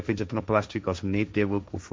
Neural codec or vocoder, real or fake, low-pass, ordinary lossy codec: codec, 16 kHz, 1.1 kbps, Voila-Tokenizer; fake; none; none